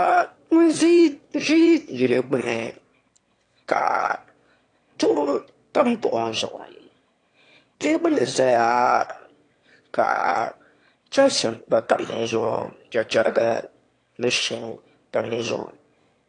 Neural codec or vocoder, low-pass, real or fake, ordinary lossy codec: autoencoder, 22.05 kHz, a latent of 192 numbers a frame, VITS, trained on one speaker; 9.9 kHz; fake; AAC, 48 kbps